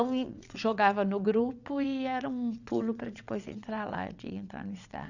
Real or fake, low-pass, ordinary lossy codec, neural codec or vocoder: fake; 7.2 kHz; none; vocoder, 22.05 kHz, 80 mel bands, WaveNeXt